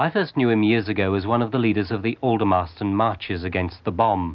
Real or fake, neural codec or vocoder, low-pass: real; none; 7.2 kHz